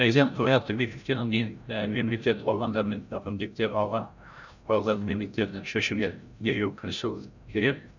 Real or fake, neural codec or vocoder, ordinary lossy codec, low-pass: fake; codec, 16 kHz, 0.5 kbps, FreqCodec, larger model; none; 7.2 kHz